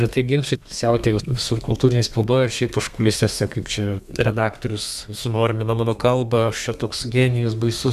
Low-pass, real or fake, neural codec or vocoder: 14.4 kHz; fake; codec, 32 kHz, 1.9 kbps, SNAC